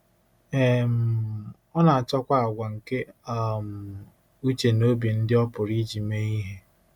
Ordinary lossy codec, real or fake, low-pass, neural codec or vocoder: MP3, 96 kbps; real; 19.8 kHz; none